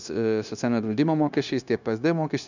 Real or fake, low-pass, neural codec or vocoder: fake; 7.2 kHz; codec, 16 kHz, 0.9 kbps, LongCat-Audio-Codec